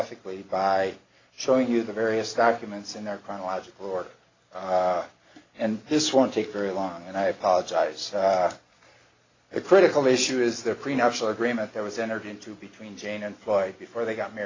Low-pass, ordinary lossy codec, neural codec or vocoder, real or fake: 7.2 kHz; AAC, 32 kbps; none; real